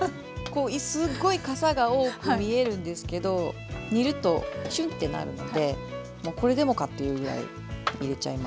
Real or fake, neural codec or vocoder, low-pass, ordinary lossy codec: real; none; none; none